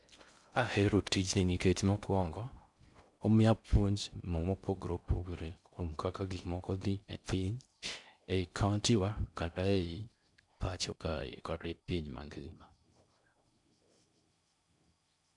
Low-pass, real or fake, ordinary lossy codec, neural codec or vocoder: 10.8 kHz; fake; none; codec, 16 kHz in and 24 kHz out, 0.6 kbps, FocalCodec, streaming, 4096 codes